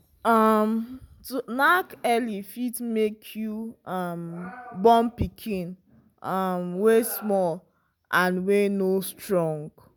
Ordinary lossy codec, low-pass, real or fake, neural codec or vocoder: none; none; real; none